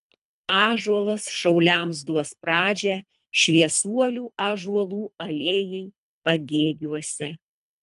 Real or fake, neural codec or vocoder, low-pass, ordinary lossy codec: fake; codec, 24 kHz, 3 kbps, HILCodec; 10.8 kHz; AAC, 96 kbps